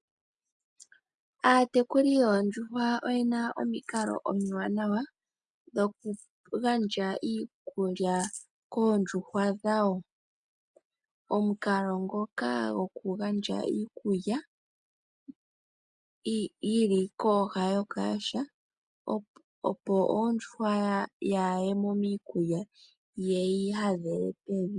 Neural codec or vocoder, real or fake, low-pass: none; real; 10.8 kHz